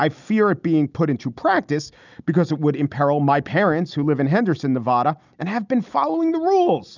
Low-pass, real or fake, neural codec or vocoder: 7.2 kHz; real; none